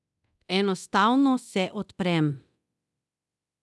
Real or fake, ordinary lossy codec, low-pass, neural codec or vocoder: fake; none; none; codec, 24 kHz, 0.9 kbps, DualCodec